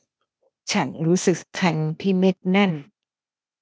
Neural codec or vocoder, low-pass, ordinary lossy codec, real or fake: codec, 16 kHz, 0.8 kbps, ZipCodec; none; none; fake